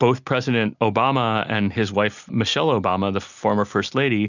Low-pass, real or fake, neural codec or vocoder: 7.2 kHz; real; none